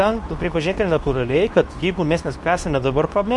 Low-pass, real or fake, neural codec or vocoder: 10.8 kHz; fake; codec, 24 kHz, 0.9 kbps, WavTokenizer, medium speech release version 2